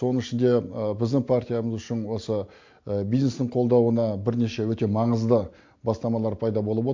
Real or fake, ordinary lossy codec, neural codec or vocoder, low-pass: real; MP3, 48 kbps; none; 7.2 kHz